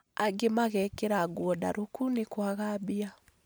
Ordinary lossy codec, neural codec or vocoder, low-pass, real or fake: none; none; none; real